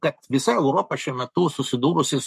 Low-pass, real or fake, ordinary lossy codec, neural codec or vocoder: 14.4 kHz; fake; MP3, 64 kbps; codec, 44.1 kHz, 7.8 kbps, Pupu-Codec